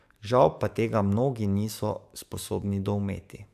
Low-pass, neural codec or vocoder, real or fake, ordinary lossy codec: 14.4 kHz; codec, 44.1 kHz, 7.8 kbps, DAC; fake; AAC, 96 kbps